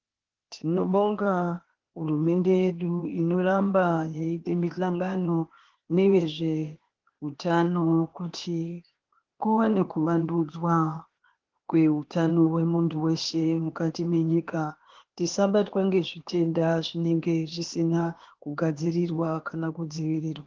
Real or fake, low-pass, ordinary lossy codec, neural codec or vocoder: fake; 7.2 kHz; Opus, 16 kbps; codec, 16 kHz, 0.8 kbps, ZipCodec